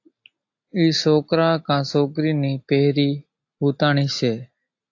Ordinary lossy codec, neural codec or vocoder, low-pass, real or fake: AAC, 48 kbps; none; 7.2 kHz; real